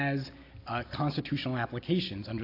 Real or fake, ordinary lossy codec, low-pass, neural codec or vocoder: real; AAC, 32 kbps; 5.4 kHz; none